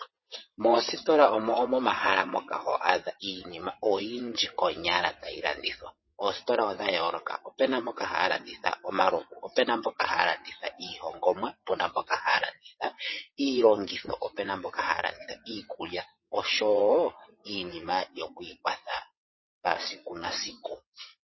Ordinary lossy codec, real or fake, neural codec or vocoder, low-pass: MP3, 24 kbps; fake; vocoder, 22.05 kHz, 80 mel bands, WaveNeXt; 7.2 kHz